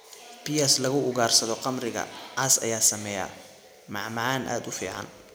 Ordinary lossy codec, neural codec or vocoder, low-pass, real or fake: none; none; none; real